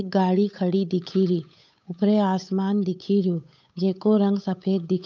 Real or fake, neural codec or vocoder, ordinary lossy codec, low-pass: fake; codec, 16 kHz, 8 kbps, FunCodec, trained on Chinese and English, 25 frames a second; none; 7.2 kHz